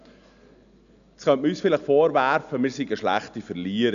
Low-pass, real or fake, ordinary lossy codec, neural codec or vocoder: 7.2 kHz; real; none; none